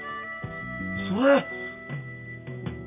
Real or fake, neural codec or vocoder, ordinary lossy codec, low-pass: real; none; none; 3.6 kHz